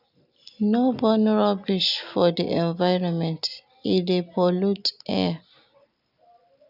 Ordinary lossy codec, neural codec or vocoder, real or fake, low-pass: none; none; real; 5.4 kHz